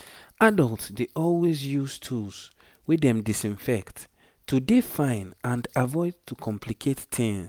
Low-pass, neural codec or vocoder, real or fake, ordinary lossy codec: none; none; real; none